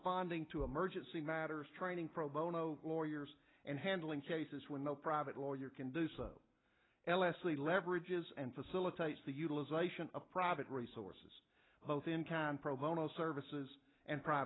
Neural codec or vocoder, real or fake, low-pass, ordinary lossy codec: none; real; 7.2 kHz; AAC, 16 kbps